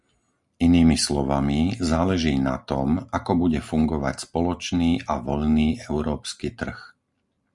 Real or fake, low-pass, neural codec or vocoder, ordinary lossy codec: real; 10.8 kHz; none; Opus, 64 kbps